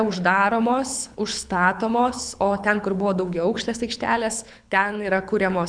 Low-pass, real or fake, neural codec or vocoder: 9.9 kHz; fake; codec, 24 kHz, 6 kbps, HILCodec